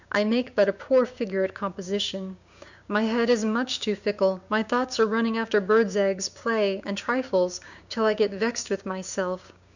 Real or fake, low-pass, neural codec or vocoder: fake; 7.2 kHz; codec, 16 kHz, 6 kbps, DAC